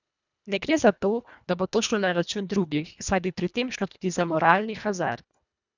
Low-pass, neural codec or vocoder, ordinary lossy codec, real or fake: 7.2 kHz; codec, 24 kHz, 1.5 kbps, HILCodec; none; fake